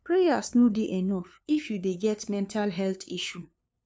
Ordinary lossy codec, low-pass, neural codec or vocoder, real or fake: none; none; codec, 16 kHz, 2 kbps, FunCodec, trained on LibriTTS, 25 frames a second; fake